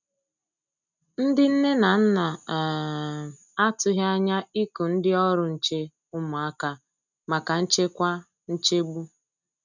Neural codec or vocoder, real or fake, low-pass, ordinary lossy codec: none; real; 7.2 kHz; none